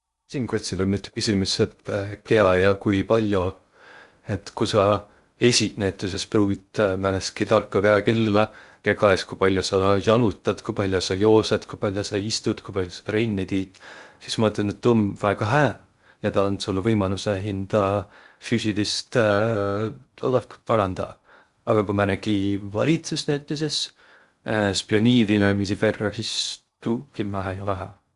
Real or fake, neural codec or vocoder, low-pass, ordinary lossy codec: fake; codec, 16 kHz in and 24 kHz out, 0.6 kbps, FocalCodec, streaming, 2048 codes; 10.8 kHz; Opus, 64 kbps